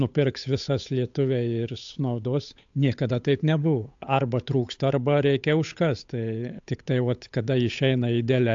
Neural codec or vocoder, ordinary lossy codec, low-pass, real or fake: codec, 16 kHz, 8 kbps, FunCodec, trained on Chinese and English, 25 frames a second; AAC, 64 kbps; 7.2 kHz; fake